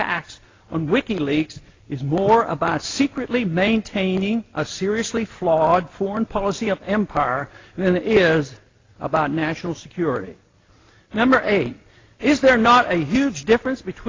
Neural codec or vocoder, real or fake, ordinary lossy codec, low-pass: none; real; AAC, 32 kbps; 7.2 kHz